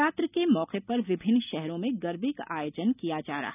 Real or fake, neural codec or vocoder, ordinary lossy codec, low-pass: real; none; none; 3.6 kHz